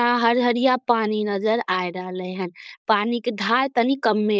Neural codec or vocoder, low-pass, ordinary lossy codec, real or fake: codec, 16 kHz, 4.8 kbps, FACodec; none; none; fake